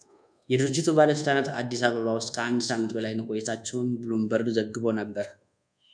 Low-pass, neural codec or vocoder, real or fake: 9.9 kHz; codec, 24 kHz, 1.2 kbps, DualCodec; fake